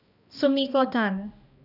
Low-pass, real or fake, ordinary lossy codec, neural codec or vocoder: 5.4 kHz; fake; none; codec, 16 kHz, 1 kbps, X-Codec, HuBERT features, trained on balanced general audio